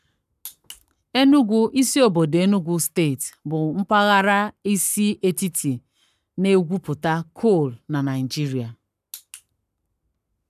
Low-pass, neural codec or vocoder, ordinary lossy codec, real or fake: 14.4 kHz; codec, 44.1 kHz, 7.8 kbps, Pupu-Codec; none; fake